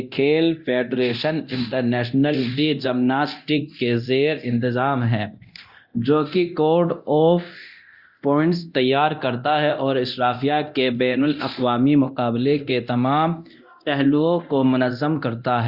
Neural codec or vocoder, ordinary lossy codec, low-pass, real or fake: codec, 24 kHz, 0.9 kbps, DualCodec; Opus, 64 kbps; 5.4 kHz; fake